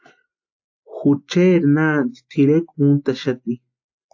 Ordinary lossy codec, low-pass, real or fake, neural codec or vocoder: AAC, 48 kbps; 7.2 kHz; real; none